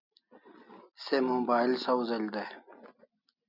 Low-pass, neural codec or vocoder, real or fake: 5.4 kHz; none; real